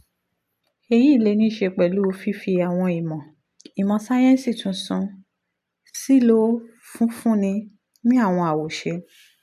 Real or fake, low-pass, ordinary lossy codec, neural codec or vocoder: real; 14.4 kHz; none; none